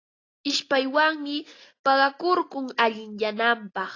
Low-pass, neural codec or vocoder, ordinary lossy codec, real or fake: 7.2 kHz; none; AAC, 32 kbps; real